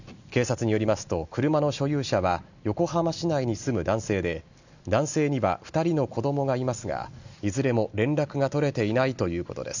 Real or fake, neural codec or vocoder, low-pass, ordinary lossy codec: real; none; 7.2 kHz; none